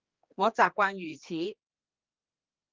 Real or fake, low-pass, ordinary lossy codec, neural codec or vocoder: fake; 7.2 kHz; Opus, 32 kbps; codec, 16 kHz, 2 kbps, X-Codec, HuBERT features, trained on general audio